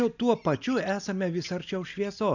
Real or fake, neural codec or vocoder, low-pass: real; none; 7.2 kHz